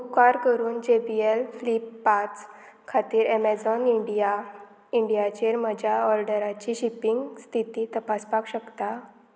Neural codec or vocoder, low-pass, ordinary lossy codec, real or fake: none; none; none; real